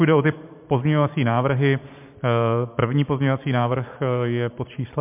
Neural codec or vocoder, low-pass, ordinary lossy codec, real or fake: vocoder, 44.1 kHz, 128 mel bands every 512 samples, BigVGAN v2; 3.6 kHz; MP3, 32 kbps; fake